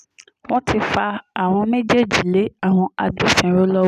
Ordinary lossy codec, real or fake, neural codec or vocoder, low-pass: none; real; none; 14.4 kHz